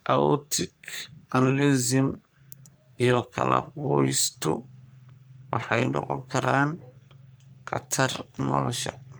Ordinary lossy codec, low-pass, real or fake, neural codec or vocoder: none; none; fake; codec, 44.1 kHz, 3.4 kbps, Pupu-Codec